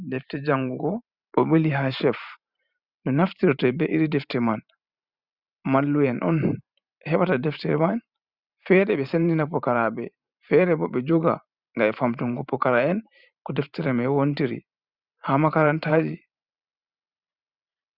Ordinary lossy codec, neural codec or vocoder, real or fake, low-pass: Opus, 64 kbps; vocoder, 44.1 kHz, 128 mel bands every 512 samples, BigVGAN v2; fake; 5.4 kHz